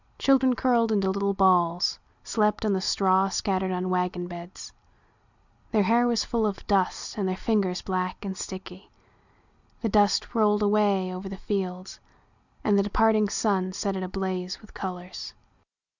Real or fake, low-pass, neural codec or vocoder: real; 7.2 kHz; none